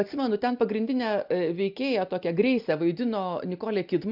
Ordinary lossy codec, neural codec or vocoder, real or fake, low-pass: AAC, 48 kbps; none; real; 5.4 kHz